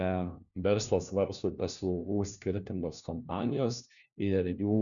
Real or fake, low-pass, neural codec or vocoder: fake; 7.2 kHz; codec, 16 kHz, 1 kbps, FunCodec, trained on LibriTTS, 50 frames a second